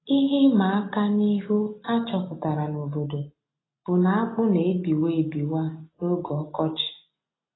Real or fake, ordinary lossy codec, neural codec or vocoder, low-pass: real; AAC, 16 kbps; none; 7.2 kHz